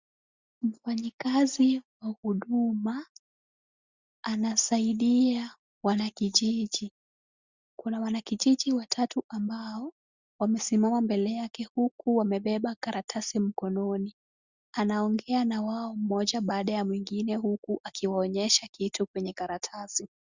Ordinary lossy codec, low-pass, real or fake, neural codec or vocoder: Opus, 64 kbps; 7.2 kHz; real; none